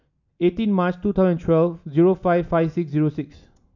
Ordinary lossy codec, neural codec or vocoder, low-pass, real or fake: none; none; 7.2 kHz; real